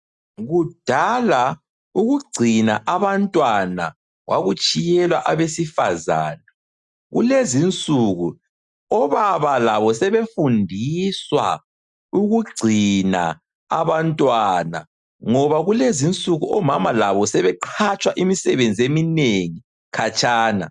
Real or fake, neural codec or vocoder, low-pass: real; none; 10.8 kHz